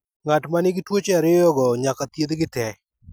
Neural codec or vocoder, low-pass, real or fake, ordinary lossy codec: none; none; real; none